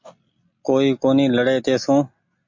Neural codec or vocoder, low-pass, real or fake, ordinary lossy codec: none; 7.2 kHz; real; MP3, 48 kbps